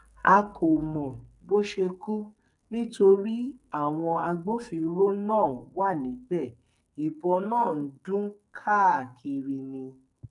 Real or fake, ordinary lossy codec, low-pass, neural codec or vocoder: fake; MP3, 96 kbps; 10.8 kHz; codec, 44.1 kHz, 2.6 kbps, SNAC